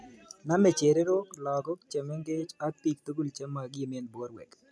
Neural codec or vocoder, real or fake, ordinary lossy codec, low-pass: none; real; none; none